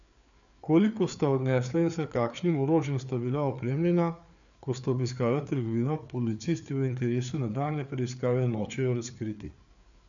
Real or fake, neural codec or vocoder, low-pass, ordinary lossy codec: fake; codec, 16 kHz, 4 kbps, FreqCodec, larger model; 7.2 kHz; none